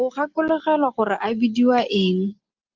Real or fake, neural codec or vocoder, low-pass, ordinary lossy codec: real; none; 7.2 kHz; Opus, 32 kbps